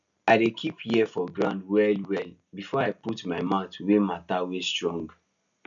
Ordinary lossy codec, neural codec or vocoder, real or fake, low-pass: none; none; real; 7.2 kHz